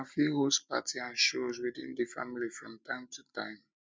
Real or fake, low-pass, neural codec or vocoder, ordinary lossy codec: real; none; none; none